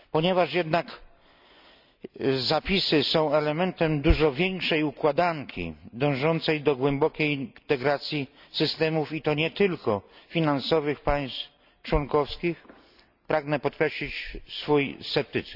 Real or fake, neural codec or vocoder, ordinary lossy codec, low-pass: real; none; none; 5.4 kHz